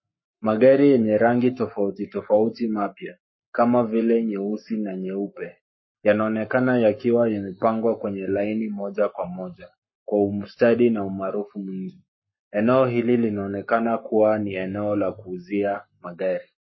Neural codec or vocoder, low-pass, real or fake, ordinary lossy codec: codec, 44.1 kHz, 7.8 kbps, Pupu-Codec; 7.2 kHz; fake; MP3, 24 kbps